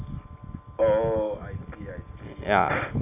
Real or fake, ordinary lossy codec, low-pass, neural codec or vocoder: real; none; 3.6 kHz; none